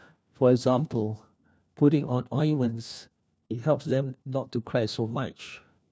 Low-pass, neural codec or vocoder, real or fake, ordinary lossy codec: none; codec, 16 kHz, 1 kbps, FunCodec, trained on LibriTTS, 50 frames a second; fake; none